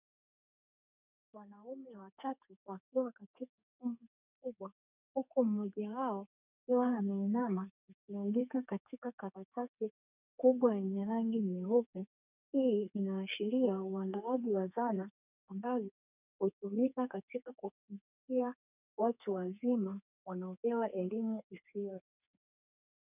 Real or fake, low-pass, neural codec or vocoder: fake; 3.6 kHz; codec, 32 kHz, 1.9 kbps, SNAC